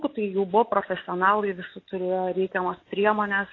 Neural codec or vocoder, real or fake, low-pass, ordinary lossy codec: none; real; 7.2 kHz; AAC, 32 kbps